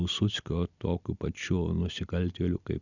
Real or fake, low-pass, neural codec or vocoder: fake; 7.2 kHz; vocoder, 44.1 kHz, 128 mel bands every 256 samples, BigVGAN v2